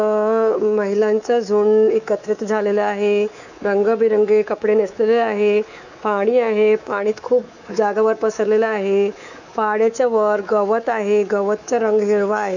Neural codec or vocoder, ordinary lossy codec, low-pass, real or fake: codec, 24 kHz, 3.1 kbps, DualCodec; none; 7.2 kHz; fake